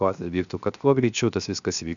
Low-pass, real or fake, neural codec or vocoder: 7.2 kHz; fake; codec, 16 kHz, 0.7 kbps, FocalCodec